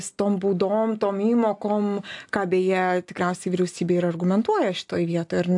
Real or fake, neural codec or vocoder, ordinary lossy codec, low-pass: real; none; AAC, 64 kbps; 10.8 kHz